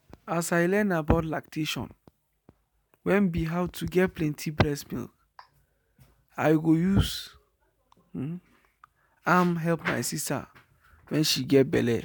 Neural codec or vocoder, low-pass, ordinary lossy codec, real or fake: none; none; none; real